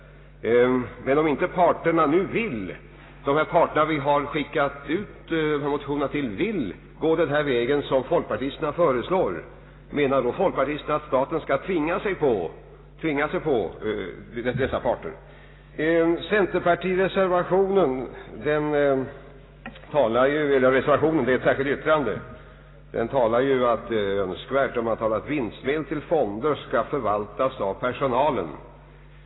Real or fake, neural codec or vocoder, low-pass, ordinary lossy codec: real; none; 7.2 kHz; AAC, 16 kbps